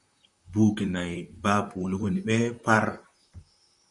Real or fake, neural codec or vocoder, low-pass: fake; vocoder, 44.1 kHz, 128 mel bands, Pupu-Vocoder; 10.8 kHz